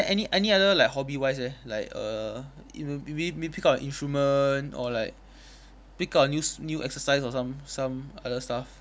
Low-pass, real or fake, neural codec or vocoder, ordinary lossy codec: none; real; none; none